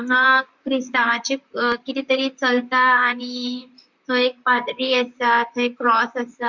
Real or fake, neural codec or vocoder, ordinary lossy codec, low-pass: fake; vocoder, 44.1 kHz, 128 mel bands, Pupu-Vocoder; Opus, 64 kbps; 7.2 kHz